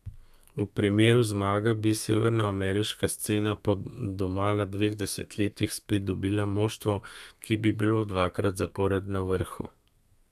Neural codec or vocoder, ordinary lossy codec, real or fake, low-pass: codec, 32 kHz, 1.9 kbps, SNAC; none; fake; 14.4 kHz